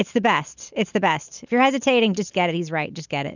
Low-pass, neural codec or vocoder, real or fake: 7.2 kHz; none; real